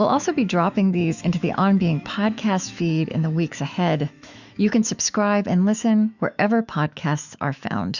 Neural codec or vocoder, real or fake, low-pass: autoencoder, 48 kHz, 128 numbers a frame, DAC-VAE, trained on Japanese speech; fake; 7.2 kHz